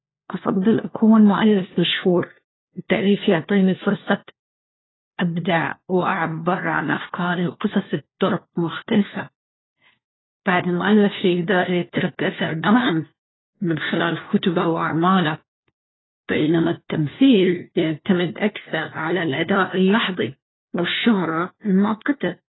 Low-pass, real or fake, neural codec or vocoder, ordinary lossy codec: 7.2 kHz; fake; codec, 16 kHz, 1 kbps, FunCodec, trained on LibriTTS, 50 frames a second; AAC, 16 kbps